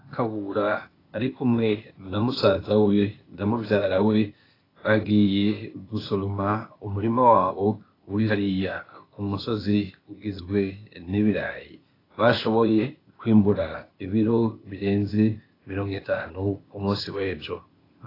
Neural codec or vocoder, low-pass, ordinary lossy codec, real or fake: codec, 16 kHz, 0.8 kbps, ZipCodec; 5.4 kHz; AAC, 24 kbps; fake